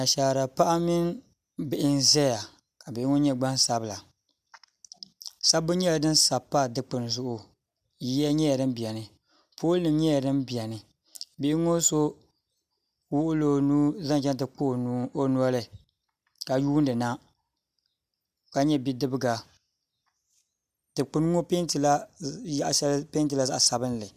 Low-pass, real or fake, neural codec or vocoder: 14.4 kHz; real; none